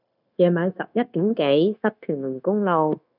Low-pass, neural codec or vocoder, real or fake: 5.4 kHz; codec, 16 kHz, 0.9 kbps, LongCat-Audio-Codec; fake